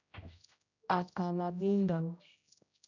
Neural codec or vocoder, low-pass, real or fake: codec, 16 kHz, 0.5 kbps, X-Codec, HuBERT features, trained on general audio; 7.2 kHz; fake